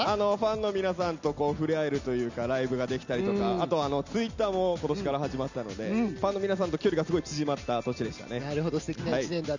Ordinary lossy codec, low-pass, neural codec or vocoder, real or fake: none; 7.2 kHz; none; real